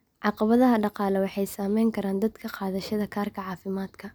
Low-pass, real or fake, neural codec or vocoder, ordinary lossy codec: none; real; none; none